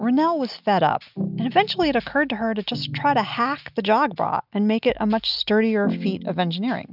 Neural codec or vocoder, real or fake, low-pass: none; real; 5.4 kHz